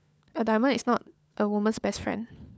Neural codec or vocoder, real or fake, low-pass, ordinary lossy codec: codec, 16 kHz, 4 kbps, FunCodec, trained on LibriTTS, 50 frames a second; fake; none; none